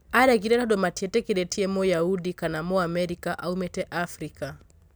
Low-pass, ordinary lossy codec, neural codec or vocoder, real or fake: none; none; none; real